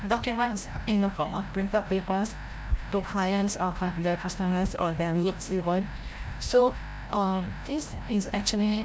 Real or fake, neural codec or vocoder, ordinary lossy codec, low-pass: fake; codec, 16 kHz, 0.5 kbps, FreqCodec, larger model; none; none